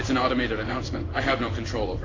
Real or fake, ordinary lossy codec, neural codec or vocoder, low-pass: fake; AAC, 32 kbps; codec, 16 kHz in and 24 kHz out, 1 kbps, XY-Tokenizer; 7.2 kHz